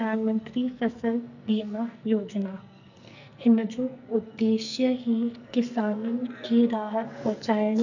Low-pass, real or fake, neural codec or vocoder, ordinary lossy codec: 7.2 kHz; fake; codec, 44.1 kHz, 2.6 kbps, SNAC; none